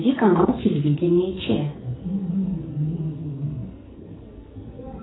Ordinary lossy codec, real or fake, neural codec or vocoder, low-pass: AAC, 16 kbps; fake; codec, 44.1 kHz, 2.6 kbps, SNAC; 7.2 kHz